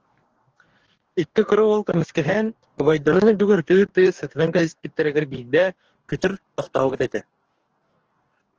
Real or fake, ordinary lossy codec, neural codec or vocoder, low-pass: fake; Opus, 16 kbps; codec, 44.1 kHz, 2.6 kbps, DAC; 7.2 kHz